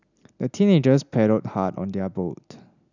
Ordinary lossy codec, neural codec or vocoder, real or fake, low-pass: none; none; real; 7.2 kHz